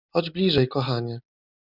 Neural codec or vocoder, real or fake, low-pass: none; real; 5.4 kHz